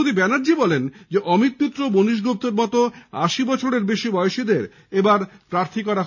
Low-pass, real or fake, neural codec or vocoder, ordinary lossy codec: 7.2 kHz; real; none; none